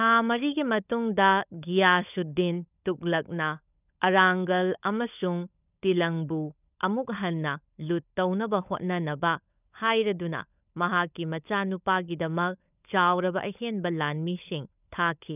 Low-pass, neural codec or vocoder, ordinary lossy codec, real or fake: 3.6 kHz; codec, 16 kHz, 16 kbps, FunCodec, trained on LibriTTS, 50 frames a second; none; fake